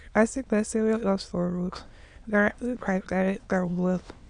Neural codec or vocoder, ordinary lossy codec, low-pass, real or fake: autoencoder, 22.05 kHz, a latent of 192 numbers a frame, VITS, trained on many speakers; none; 9.9 kHz; fake